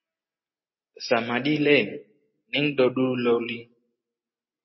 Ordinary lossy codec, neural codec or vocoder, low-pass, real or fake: MP3, 24 kbps; none; 7.2 kHz; real